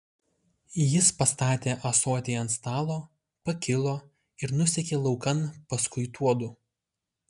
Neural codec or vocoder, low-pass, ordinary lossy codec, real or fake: none; 10.8 kHz; MP3, 96 kbps; real